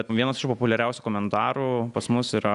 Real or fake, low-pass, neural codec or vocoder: real; 10.8 kHz; none